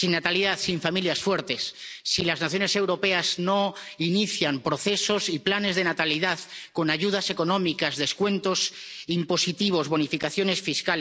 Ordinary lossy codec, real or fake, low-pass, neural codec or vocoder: none; real; none; none